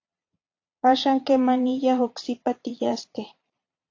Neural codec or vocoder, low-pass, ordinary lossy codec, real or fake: vocoder, 22.05 kHz, 80 mel bands, Vocos; 7.2 kHz; AAC, 48 kbps; fake